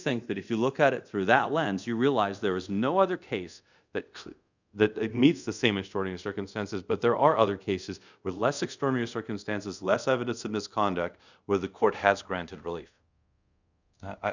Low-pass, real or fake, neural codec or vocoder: 7.2 kHz; fake; codec, 24 kHz, 0.5 kbps, DualCodec